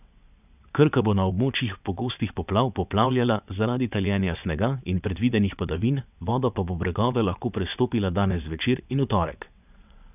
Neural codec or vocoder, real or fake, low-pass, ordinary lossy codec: vocoder, 22.05 kHz, 80 mel bands, WaveNeXt; fake; 3.6 kHz; none